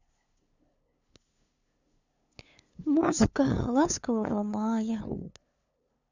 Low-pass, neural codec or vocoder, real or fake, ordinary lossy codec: 7.2 kHz; codec, 16 kHz, 2 kbps, FunCodec, trained on LibriTTS, 25 frames a second; fake; none